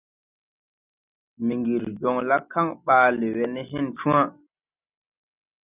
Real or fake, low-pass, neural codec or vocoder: real; 3.6 kHz; none